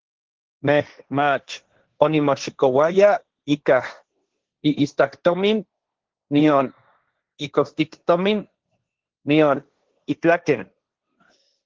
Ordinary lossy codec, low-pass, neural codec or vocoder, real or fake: Opus, 16 kbps; 7.2 kHz; codec, 16 kHz, 1.1 kbps, Voila-Tokenizer; fake